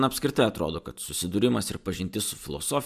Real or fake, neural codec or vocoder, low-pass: real; none; 14.4 kHz